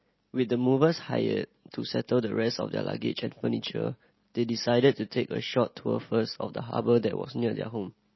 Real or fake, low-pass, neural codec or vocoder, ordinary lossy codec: real; 7.2 kHz; none; MP3, 24 kbps